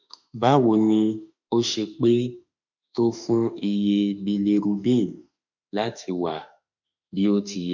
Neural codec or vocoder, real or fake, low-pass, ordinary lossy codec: autoencoder, 48 kHz, 32 numbers a frame, DAC-VAE, trained on Japanese speech; fake; 7.2 kHz; none